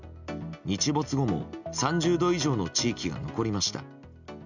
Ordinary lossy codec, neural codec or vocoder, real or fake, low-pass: none; none; real; 7.2 kHz